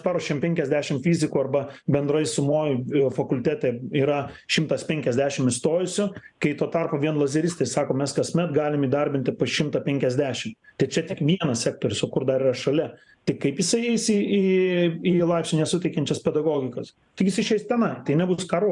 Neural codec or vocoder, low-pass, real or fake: vocoder, 44.1 kHz, 128 mel bands every 512 samples, BigVGAN v2; 10.8 kHz; fake